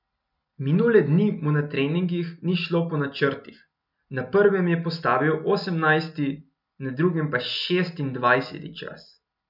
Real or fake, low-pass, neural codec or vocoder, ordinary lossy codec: real; 5.4 kHz; none; none